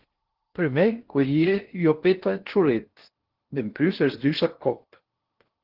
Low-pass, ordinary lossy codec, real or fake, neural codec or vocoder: 5.4 kHz; Opus, 16 kbps; fake; codec, 16 kHz in and 24 kHz out, 0.6 kbps, FocalCodec, streaming, 2048 codes